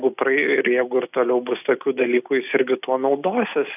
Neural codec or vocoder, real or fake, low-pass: none; real; 3.6 kHz